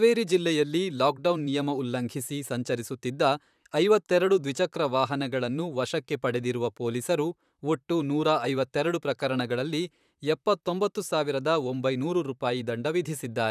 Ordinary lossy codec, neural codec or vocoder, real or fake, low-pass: none; vocoder, 44.1 kHz, 128 mel bands, Pupu-Vocoder; fake; 14.4 kHz